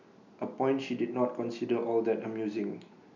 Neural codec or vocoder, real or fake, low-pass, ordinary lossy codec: none; real; 7.2 kHz; none